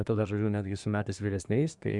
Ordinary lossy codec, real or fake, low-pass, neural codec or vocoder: Opus, 64 kbps; fake; 10.8 kHz; codec, 24 kHz, 1 kbps, SNAC